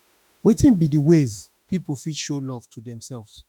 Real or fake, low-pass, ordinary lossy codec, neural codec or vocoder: fake; 19.8 kHz; none; autoencoder, 48 kHz, 32 numbers a frame, DAC-VAE, trained on Japanese speech